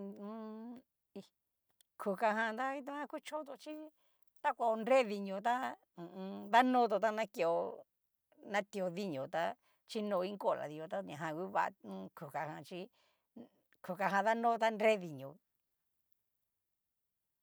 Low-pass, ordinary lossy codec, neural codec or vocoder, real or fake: none; none; none; real